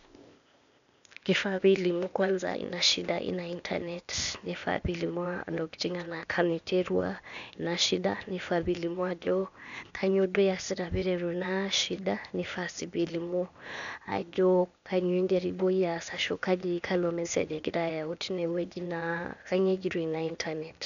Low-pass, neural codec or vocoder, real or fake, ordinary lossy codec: 7.2 kHz; codec, 16 kHz, 0.8 kbps, ZipCodec; fake; none